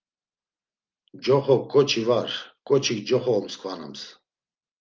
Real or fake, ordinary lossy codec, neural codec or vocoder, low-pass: real; Opus, 24 kbps; none; 7.2 kHz